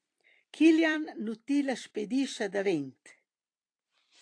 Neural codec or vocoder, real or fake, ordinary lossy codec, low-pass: vocoder, 44.1 kHz, 128 mel bands every 256 samples, BigVGAN v2; fake; AAC, 48 kbps; 9.9 kHz